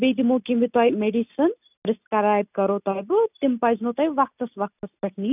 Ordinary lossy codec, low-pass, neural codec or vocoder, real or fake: none; 3.6 kHz; none; real